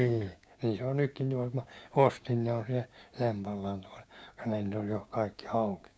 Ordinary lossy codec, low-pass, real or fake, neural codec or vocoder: none; none; fake; codec, 16 kHz, 6 kbps, DAC